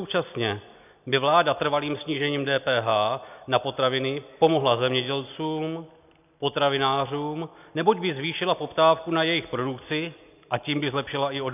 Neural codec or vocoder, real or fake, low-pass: none; real; 3.6 kHz